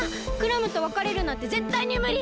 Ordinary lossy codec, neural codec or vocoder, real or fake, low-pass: none; none; real; none